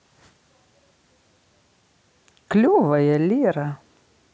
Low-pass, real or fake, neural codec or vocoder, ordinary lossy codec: none; real; none; none